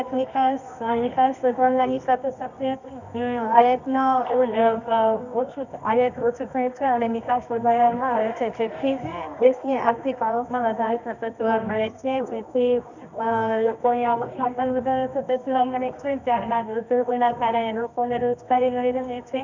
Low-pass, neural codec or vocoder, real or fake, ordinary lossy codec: 7.2 kHz; codec, 24 kHz, 0.9 kbps, WavTokenizer, medium music audio release; fake; none